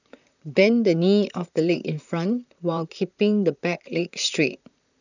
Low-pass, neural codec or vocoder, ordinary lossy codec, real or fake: 7.2 kHz; vocoder, 44.1 kHz, 128 mel bands, Pupu-Vocoder; none; fake